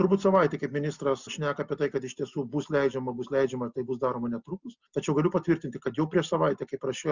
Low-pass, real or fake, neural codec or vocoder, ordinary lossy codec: 7.2 kHz; real; none; Opus, 64 kbps